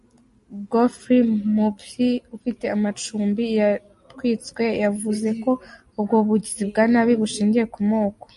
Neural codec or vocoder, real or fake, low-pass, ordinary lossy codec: none; real; 14.4 kHz; MP3, 48 kbps